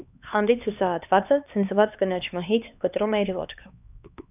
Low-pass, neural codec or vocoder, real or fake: 3.6 kHz; codec, 16 kHz, 2 kbps, X-Codec, HuBERT features, trained on LibriSpeech; fake